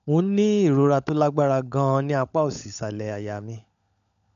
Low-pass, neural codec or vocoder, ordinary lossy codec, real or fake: 7.2 kHz; codec, 16 kHz, 16 kbps, FunCodec, trained on LibriTTS, 50 frames a second; MP3, 64 kbps; fake